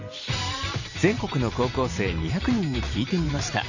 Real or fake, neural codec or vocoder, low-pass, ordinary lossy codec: fake; vocoder, 44.1 kHz, 128 mel bands every 512 samples, BigVGAN v2; 7.2 kHz; none